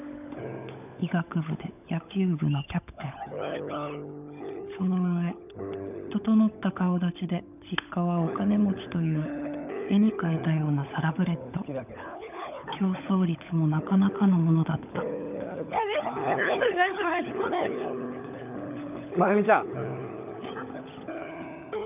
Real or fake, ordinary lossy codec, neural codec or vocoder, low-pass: fake; none; codec, 16 kHz, 16 kbps, FunCodec, trained on LibriTTS, 50 frames a second; 3.6 kHz